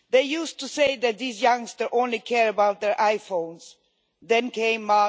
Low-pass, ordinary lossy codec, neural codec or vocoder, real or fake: none; none; none; real